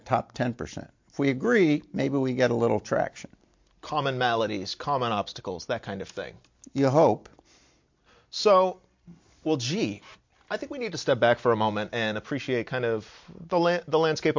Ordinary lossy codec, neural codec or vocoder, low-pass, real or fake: MP3, 48 kbps; none; 7.2 kHz; real